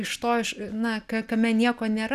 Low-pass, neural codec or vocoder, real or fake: 14.4 kHz; none; real